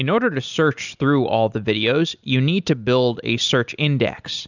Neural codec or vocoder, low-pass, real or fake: none; 7.2 kHz; real